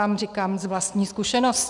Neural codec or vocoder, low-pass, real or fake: none; 10.8 kHz; real